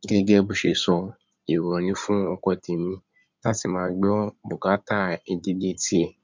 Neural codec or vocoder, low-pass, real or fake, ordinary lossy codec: codec, 16 kHz in and 24 kHz out, 2.2 kbps, FireRedTTS-2 codec; 7.2 kHz; fake; MP3, 64 kbps